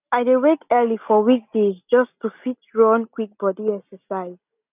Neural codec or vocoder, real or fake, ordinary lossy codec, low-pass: none; real; none; 3.6 kHz